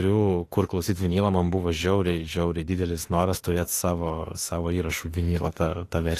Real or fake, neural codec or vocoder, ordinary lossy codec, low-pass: fake; autoencoder, 48 kHz, 32 numbers a frame, DAC-VAE, trained on Japanese speech; AAC, 48 kbps; 14.4 kHz